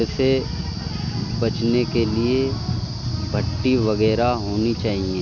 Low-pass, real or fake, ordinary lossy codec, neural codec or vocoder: 7.2 kHz; real; none; none